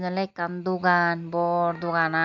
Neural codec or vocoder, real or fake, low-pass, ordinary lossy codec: none; real; 7.2 kHz; MP3, 64 kbps